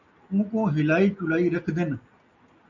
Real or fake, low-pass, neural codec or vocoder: real; 7.2 kHz; none